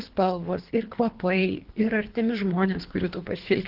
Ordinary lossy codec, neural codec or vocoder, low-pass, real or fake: Opus, 16 kbps; codec, 24 kHz, 3 kbps, HILCodec; 5.4 kHz; fake